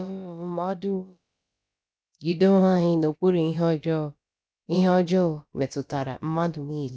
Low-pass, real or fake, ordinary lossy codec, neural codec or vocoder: none; fake; none; codec, 16 kHz, about 1 kbps, DyCAST, with the encoder's durations